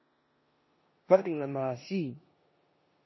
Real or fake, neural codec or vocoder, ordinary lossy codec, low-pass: fake; codec, 16 kHz in and 24 kHz out, 0.9 kbps, LongCat-Audio-Codec, four codebook decoder; MP3, 24 kbps; 7.2 kHz